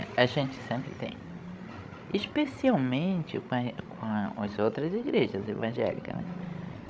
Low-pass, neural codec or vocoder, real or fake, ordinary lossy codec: none; codec, 16 kHz, 16 kbps, FreqCodec, larger model; fake; none